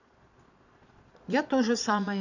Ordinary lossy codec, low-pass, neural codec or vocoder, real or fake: none; 7.2 kHz; vocoder, 22.05 kHz, 80 mel bands, Vocos; fake